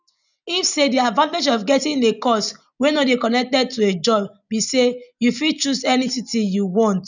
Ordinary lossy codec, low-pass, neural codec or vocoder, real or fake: none; 7.2 kHz; none; real